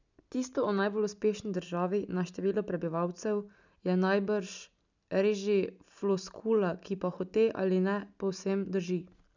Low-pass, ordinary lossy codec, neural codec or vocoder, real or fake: 7.2 kHz; none; none; real